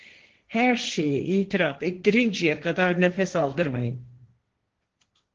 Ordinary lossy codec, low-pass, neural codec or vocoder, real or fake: Opus, 16 kbps; 7.2 kHz; codec, 16 kHz, 1.1 kbps, Voila-Tokenizer; fake